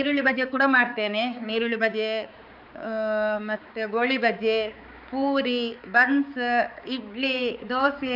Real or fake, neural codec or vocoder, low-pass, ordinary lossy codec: fake; codec, 16 kHz, 4 kbps, X-Codec, HuBERT features, trained on balanced general audio; 5.4 kHz; none